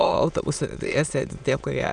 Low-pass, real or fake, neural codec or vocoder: 9.9 kHz; fake; autoencoder, 22.05 kHz, a latent of 192 numbers a frame, VITS, trained on many speakers